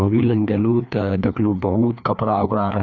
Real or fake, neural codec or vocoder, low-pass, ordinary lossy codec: fake; codec, 16 kHz, 2 kbps, FreqCodec, larger model; 7.2 kHz; none